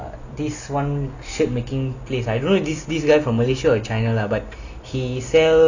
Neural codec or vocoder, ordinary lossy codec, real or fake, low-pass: none; none; real; 7.2 kHz